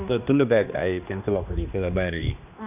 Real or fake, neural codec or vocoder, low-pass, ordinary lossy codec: fake; codec, 16 kHz, 2 kbps, X-Codec, HuBERT features, trained on general audio; 3.6 kHz; none